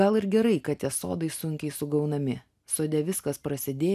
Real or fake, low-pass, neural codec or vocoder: real; 14.4 kHz; none